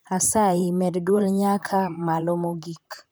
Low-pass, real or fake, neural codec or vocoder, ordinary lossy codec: none; fake; vocoder, 44.1 kHz, 128 mel bands, Pupu-Vocoder; none